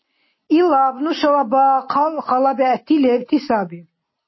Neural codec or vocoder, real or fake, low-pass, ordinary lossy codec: none; real; 7.2 kHz; MP3, 24 kbps